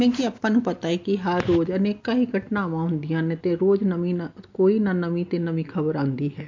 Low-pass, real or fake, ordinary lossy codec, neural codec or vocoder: 7.2 kHz; real; MP3, 48 kbps; none